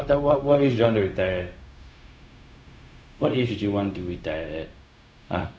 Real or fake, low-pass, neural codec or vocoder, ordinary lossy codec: fake; none; codec, 16 kHz, 0.4 kbps, LongCat-Audio-Codec; none